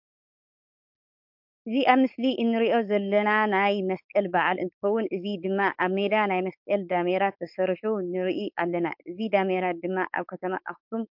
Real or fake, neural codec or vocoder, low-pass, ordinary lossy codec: fake; codec, 16 kHz, 4.8 kbps, FACodec; 5.4 kHz; MP3, 48 kbps